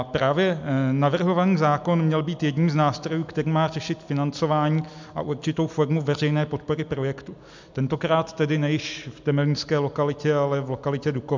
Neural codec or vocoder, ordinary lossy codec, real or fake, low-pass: none; MP3, 64 kbps; real; 7.2 kHz